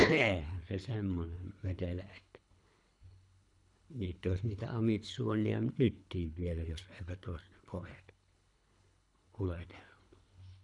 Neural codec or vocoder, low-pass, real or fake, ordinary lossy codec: codec, 24 kHz, 3 kbps, HILCodec; 10.8 kHz; fake; none